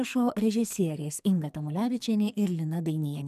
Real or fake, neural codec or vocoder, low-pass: fake; codec, 44.1 kHz, 2.6 kbps, SNAC; 14.4 kHz